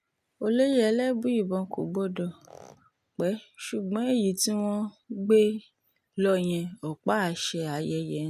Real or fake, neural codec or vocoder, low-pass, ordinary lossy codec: real; none; 14.4 kHz; none